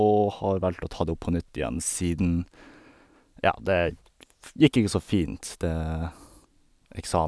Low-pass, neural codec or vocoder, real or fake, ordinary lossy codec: none; none; real; none